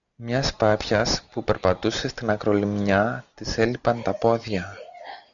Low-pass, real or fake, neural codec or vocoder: 7.2 kHz; real; none